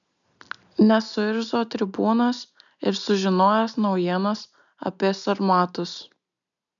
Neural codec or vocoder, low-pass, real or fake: none; 7.2 kHz; real